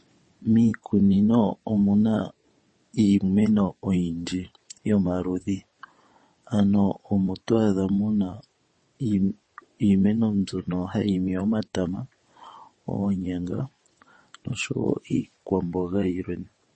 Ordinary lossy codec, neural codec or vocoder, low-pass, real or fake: MP3, 32 kbps; vocoder, 22.05 kHz, 80 mel bands, WaveNeXt; 9.9 kHz; fake